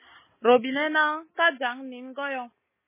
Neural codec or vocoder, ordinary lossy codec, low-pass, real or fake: none; MP3, 16 kbps; 3.6 kHz; real